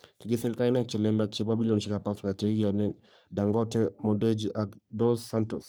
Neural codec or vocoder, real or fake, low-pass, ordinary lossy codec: codec, 44.1 kHz, 3.4 kbps, Pupu-Codec; fake; none; none